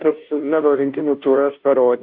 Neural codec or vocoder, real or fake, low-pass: codec, 16 kHz, 0.5 kbps, FunCodec, trained on Chinese and English, 25 frames a second; fake; 5.4 kHz